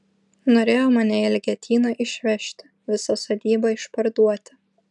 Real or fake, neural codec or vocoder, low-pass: real; none; 10.8 kHz